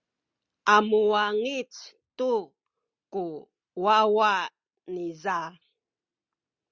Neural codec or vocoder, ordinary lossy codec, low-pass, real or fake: none; Opus, 64 kbps; 7.2 kHz; real